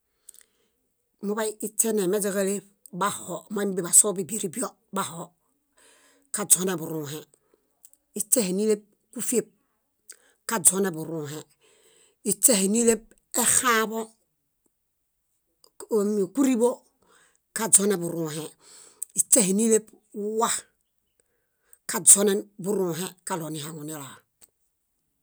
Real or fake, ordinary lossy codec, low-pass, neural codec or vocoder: real; none; none; none